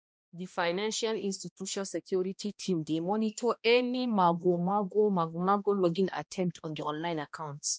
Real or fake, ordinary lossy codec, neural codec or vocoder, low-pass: fake; none; codec, 16 kHz, 1 kbps, X-Codec, HuBERT features, trained on balanced general audio; none